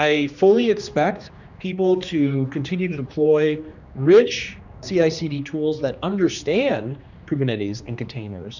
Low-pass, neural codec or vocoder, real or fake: 7.2 kHz; codec, 16 kHz, 2 kbps, X-Codec, HuBERT features, trained on general audio; fake